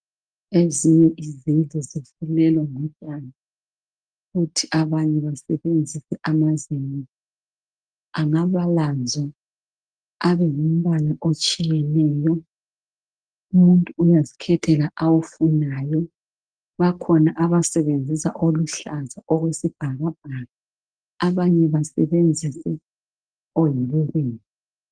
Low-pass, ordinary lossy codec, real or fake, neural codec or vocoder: 9.9 kHz; Opus, 32 kbps; real; none